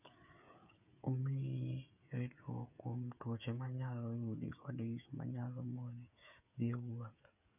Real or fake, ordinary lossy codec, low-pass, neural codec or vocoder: fake; none; 3.6 kHz; codec, 16 kHz, 8 kbps, FreqCodec, smaller model